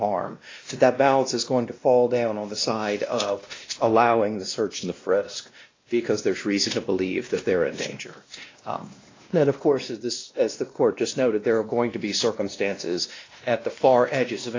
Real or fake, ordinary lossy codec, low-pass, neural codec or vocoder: fake; AAC, 32 kbps; 7.2 kHz; codec, 16 kHz, 1 kbps, X-Codec, WavLM features, trained on Multilingual LibriSpeech